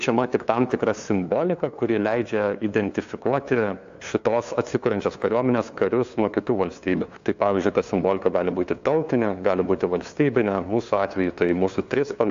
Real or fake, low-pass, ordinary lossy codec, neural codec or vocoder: fake; 7.2 kHz; AAC, 64 kbps; codec, 16 kHz, 2 kbps, FunCodec, trained on LibriTTS, 25 frames a second